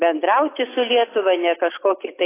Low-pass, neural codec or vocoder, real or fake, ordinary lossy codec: 3.6 kHz; none; real; AAC, 16 kbps